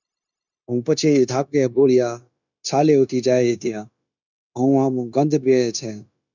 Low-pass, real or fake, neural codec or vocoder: 7.2 kHz; fake; codec, 16 kHz, 0.9 kbps, LongCat-Audio-Codec